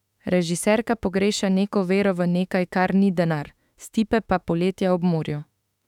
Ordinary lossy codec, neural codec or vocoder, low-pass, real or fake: none; autoencoder, 48 kHz, 32 numbers a frame, DAC-VAE, trained on Japanese speech; 19.8 kHz; fake